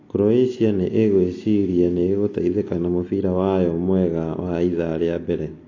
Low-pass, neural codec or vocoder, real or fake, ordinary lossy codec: 7.2 kHz; none; real; AAC, 32 kbps